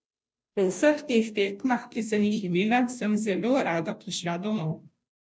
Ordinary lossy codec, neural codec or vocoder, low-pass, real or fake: none; codec, 16 kHz, 0.5 kbps, FunCodec, trained on Chinese and English, 25 frames a second; none; fake